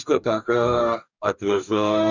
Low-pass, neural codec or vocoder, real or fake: 7.2 kHz; codec, 44.1 kHz, 2.6 kbps, DAC; fake